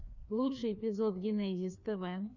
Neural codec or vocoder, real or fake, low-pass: codec, 16 kHz, 2 kbps, FreqCodec, larger model; fake; 7.2 kHz